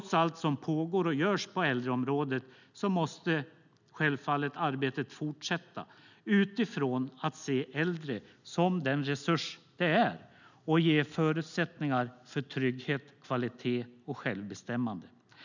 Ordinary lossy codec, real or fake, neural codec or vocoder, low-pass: none; real; none; 7.2 kHz